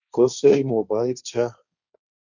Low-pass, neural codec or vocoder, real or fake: 7.2 kHz; codec, 16 kHz, 1.1 kbps, Voila-Tokenizer; fake